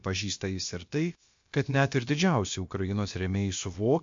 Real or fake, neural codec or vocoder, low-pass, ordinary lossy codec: fake; codec, 16 kHz, about 1 kbps, DyCAST, with the encoder's durations; 7.2 kHz; MP3, 48 kbps